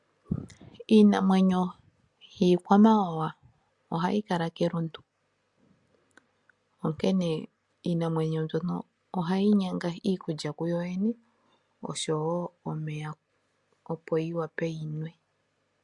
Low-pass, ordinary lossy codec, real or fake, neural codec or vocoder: 10.8 kHz; MP3, 64 kbps; real; none